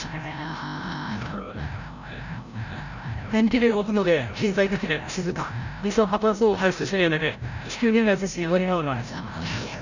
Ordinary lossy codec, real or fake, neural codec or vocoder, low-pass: none; fake; codec, 16 kHz, 0.5 kbps, FreqCodec, larger model; 7.2 kHz